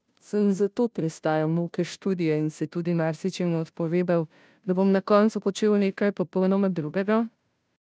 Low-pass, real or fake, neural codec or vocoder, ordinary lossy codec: none; fake; codec, 16 kHz, 0.5 kbps, FunCodec, trained on Chinese and English, 25 frames a second; none